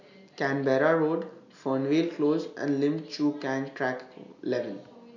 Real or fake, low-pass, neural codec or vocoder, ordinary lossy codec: real; 7.2 kHz; none; none